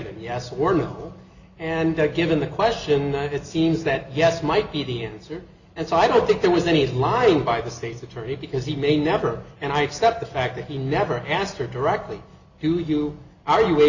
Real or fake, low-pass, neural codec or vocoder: real; 7.2 kHz; none